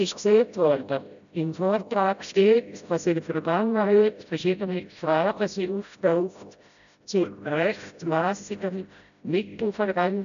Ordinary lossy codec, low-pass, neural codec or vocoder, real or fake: none; 7.2 kHz; codec, 16 kHz, 0.5 kbps, FreqCodec, smaller model; fake